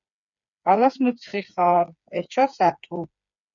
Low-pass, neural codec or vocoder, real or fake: 7.2 kHz; codec, 16 kHz, 4 kbps, FreqCodec, smaller model; fake